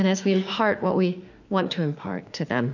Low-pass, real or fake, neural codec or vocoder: 7.2 kHz; fake; autoencoder, 48 kHz, 32 numbers a frame, DAC-VAE, trained on Japanese speech